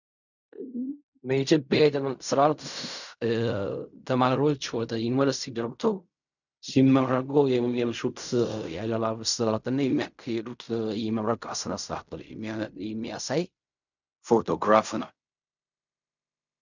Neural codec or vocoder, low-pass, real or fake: codec, 16 kHz in and 24 kHz out, 0.4 kbps, LongCat-Audio-Codec, fine tuned four codebook decoder; 7.2 kHz; fake